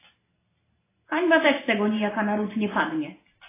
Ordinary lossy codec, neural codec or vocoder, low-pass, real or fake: AAC, 16 kbps; none; 3.6 kHz; real